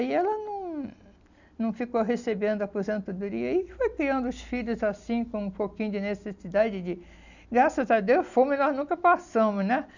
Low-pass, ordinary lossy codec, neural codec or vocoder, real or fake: 7.2 kHz; none; none; real